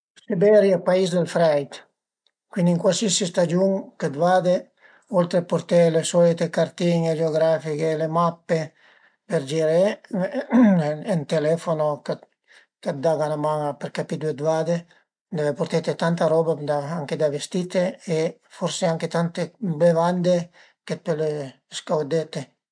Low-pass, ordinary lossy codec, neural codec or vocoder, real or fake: 9.9 kHz; MP3, 64 kbps; none; real